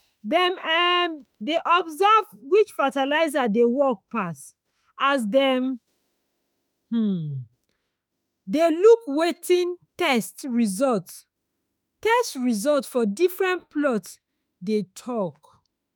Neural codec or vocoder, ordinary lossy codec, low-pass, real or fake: autoencoder, 48 kHz, 32 numbers a frame, DAC-VAE, trained on Japanese speech; none; none; fake